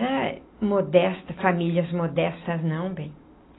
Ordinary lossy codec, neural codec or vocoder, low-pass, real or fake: AAC, 16 kbps; none; 7.2 kHz; real